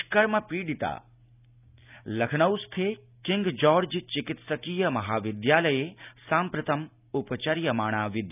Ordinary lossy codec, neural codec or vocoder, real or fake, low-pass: none; none; real; 3.6 kHz